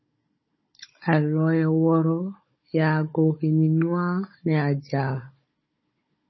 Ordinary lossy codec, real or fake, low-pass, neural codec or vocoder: MP3, 24 kbps; fake; 7.2 kHz; codec, 16 kHz, 16 kbps, FunCodec, trained on Chinese and English, 50 frames a second